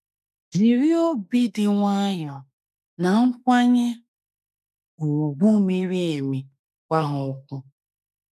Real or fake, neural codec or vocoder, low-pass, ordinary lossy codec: fake; autoencoder, 48 kHz, 32 numbers a frame, DAC-VAE, trained on Japanese speech; 14.4 kHz; none